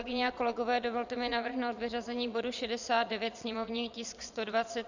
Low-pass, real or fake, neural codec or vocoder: 7.2 kHz; fake; vocoder, 22.05 kHz, 80 mel bands, Vocos